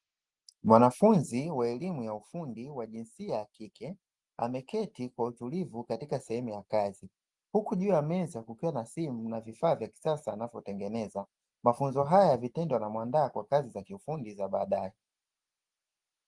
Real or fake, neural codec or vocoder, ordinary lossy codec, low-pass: real; none; Opus, 24 kbps; 10.8 kHz